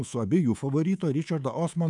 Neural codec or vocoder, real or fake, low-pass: codec, 24 kHz, 3.1 kbps, DualCodec; fake; 10.8 kHz